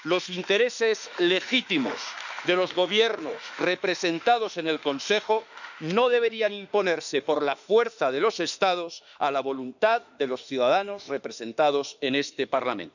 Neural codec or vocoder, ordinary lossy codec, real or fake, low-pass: autoencoder, 48 kHz, 32 numbers a frame, DAC-VAE, trained on Japanese speech; none; fake; 7.2 kHz